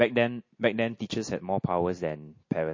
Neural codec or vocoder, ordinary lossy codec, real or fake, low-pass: none; MP3, 32 kbps; real; 7.2 kHz